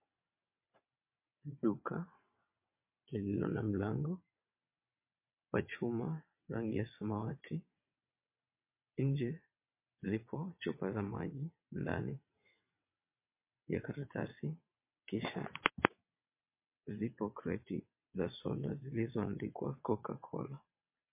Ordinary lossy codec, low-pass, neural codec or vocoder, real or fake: MP3, 24 kbps; 3.6 kHz; vocoder, 22.05 kHz, 80 mel bands, WaveNeXt; fake